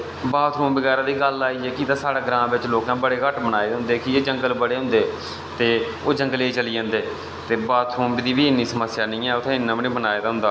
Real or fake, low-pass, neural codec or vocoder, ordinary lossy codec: real; none; none; none